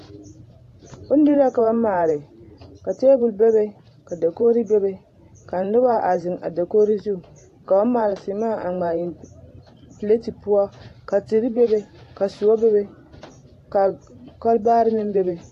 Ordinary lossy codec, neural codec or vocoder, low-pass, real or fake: AAC, 48 kbps; vocoder, 44.1 kHz, 128 mel bands every 512 samples, BigVGAN v2; 14.4 kHz; fake